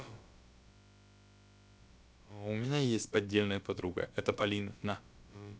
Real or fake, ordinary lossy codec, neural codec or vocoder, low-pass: fake; none; codec, 16 kHz, about 1 kbps, DyCAST, with the encoder's durations; none